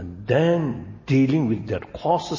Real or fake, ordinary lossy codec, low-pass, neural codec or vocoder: real; MP3, 32 kbps; 7.2 kHz; none